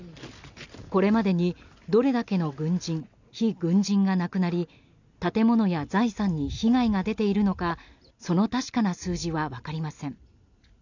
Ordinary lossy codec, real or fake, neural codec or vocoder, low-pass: none; real; none; 7.2 kHz